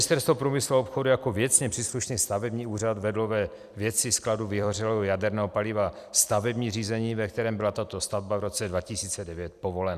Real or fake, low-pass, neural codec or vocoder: fake; 14.4 kHz; vocoder, 44.1 kHz, 128 mel bands every 256 samples, BigVGAN v2